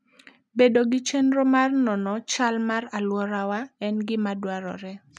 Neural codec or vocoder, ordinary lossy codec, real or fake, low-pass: none; none; real; none